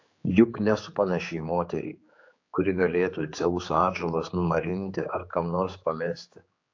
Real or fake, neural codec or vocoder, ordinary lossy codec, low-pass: fake; codec, 16 kHz, 4 kbps, X-Codec, HuBERT features, trained on general audio; AAC, 48 kbps; 7.2 kHz